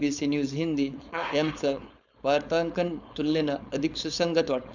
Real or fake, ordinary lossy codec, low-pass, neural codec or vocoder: fake; none; 7.2 kHz; codec, 16 kHz, 4.8 kbps, FACodec